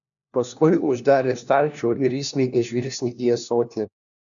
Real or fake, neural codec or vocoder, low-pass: fake; codec, 16 kHz, 1 kbps, FunCodec, trained on LibriTTS, 50 frames a second; 7.2 kHz